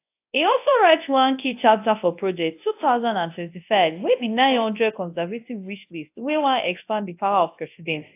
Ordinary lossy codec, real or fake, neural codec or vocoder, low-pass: AAC, 24 kbps; fake; codec, 24 kHz, 0.9 kbps, WavTokenizer, large speech release; 3.6 kHz